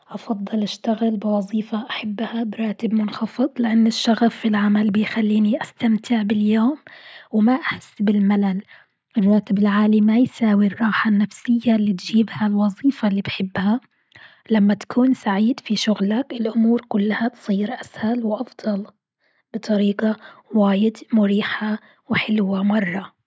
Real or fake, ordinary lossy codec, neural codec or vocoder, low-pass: real; none; none; none